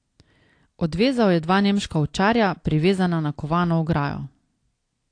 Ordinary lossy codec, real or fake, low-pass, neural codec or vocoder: AAC, 48 kbps; real; 9.9 kHz; none